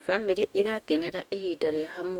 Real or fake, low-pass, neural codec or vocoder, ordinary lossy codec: fake; 19.8 kHz; codec, 44.1 kHz, 2.6 kbps, DAC; none